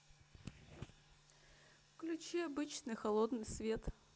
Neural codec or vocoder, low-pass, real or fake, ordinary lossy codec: none; none; real; none